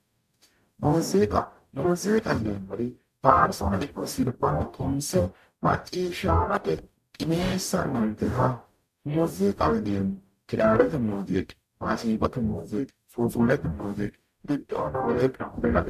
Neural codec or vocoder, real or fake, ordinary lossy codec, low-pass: codec, 44.1 kHz, 0.9 kbps, DAC; fake; MP3, 96 kbps; 14.4 kHz